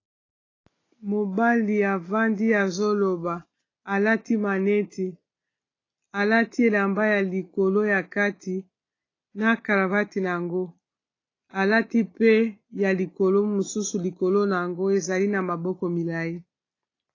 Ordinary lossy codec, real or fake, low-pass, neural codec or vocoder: AAC, 32 kbps; real; 7.2 kHz; none